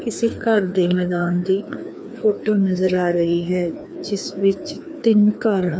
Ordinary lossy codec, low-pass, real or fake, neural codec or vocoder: none; none; fake; codec, 16 kHz, 2 kbps, FreqCodec, larger model